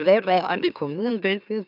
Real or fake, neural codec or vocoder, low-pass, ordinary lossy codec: fake; autoencoder, 44.1 kHz, a latent of 192 numbers a frame, MeloTTS; 5.4 kHz; none